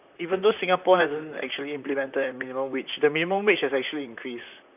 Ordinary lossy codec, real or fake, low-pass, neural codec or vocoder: none; fake; 3.6 kHz; vocoder, 44.1 kHz, 128 mel bands, Pupu-Vocoder